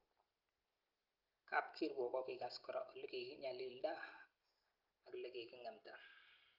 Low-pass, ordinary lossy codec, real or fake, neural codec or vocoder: 5.4 kHz; Opus, 32 kbps; real; none